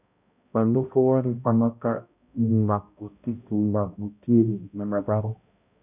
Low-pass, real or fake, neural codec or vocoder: 3.6 kHz; fake; codec, 16 kHz, 0.5 kbps, X-Codec, HuBERT features, trained on balanced general audio